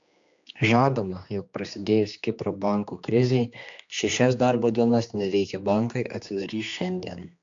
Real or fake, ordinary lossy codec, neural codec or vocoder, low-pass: fake; AAC, 64 kbps; codec, 16 kHz, 2 kbps, X-Codec, HuBERT features, trained on general audio; 7.2 kHz